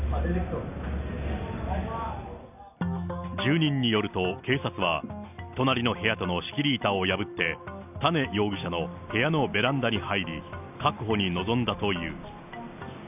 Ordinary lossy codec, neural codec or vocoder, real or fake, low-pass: none; none; real; 3.6 kHz